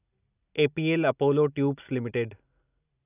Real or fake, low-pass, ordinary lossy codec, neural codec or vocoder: real; 3.6 kHz; none; none